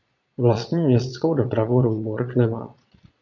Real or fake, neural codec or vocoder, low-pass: fake; vocoder, 22.05 kHz, 80 mel bands, Vocos; 7.2 kHz